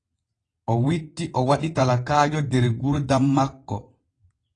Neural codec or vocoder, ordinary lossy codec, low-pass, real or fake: vocoder, 22.05 kHz, 80 mel bands, WaveNeXt; AAC, 32 kbps; 9.9 kHz; fake